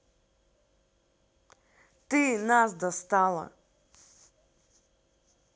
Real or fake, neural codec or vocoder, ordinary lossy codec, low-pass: real; none; none; none